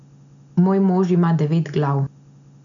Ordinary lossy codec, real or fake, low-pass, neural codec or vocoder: none; real; 7.2 kHz; none